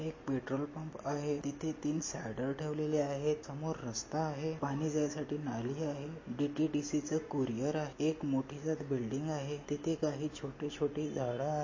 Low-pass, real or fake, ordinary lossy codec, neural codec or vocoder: 7.2 kHz; real; MP3, 32 kbps; none